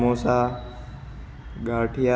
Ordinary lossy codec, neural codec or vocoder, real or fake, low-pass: none; none; real; none